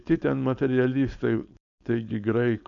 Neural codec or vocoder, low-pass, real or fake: codec, 16 kHz, 4.8 kbps, FACodec; 7.2 kHz; fake